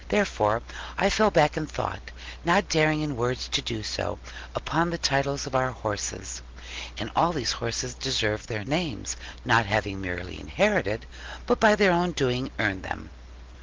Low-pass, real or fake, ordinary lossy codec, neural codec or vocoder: 7.2 kHz; real; Opus, 16 kbps; none